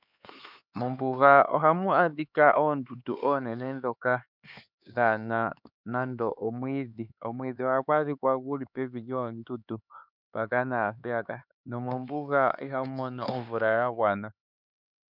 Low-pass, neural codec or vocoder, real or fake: 5.4 kHz; codec, 16 kHz, 4 kbps, X-Codec, HuBERT features, trained on LibriSpeech; fake